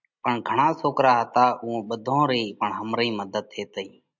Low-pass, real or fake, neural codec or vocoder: 7.2 kHz; real; none